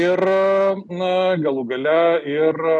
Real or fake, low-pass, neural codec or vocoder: real; 10.8 kHz; none